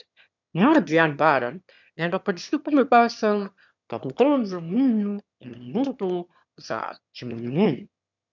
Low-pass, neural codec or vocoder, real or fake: 7.2 kHz; autoencoder, 22.05 kHz, a latent of 192 numbers a frame, VITS, trained on one speaker; fake